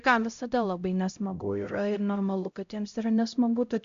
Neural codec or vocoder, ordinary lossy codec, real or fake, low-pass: codec, 16 kHz, 0.5 kbps, X-Codec, HuBERT features, trained on LibriSpeech; AAC, 64 kbps; fake; 7.2 kHz